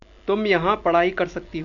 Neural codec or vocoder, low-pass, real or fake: none; 7.2 kHz; real